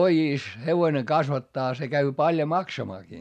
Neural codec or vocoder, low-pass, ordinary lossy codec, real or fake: none; 14.4 kHz; none; real